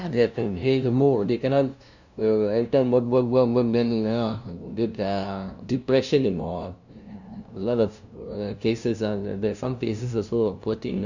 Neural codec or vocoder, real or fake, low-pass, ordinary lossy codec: codec, 16 kHz, 0.5 kbps, FunCodec, trained on LibriTTS, 25 frames a second; fake; 7.2 kHz; none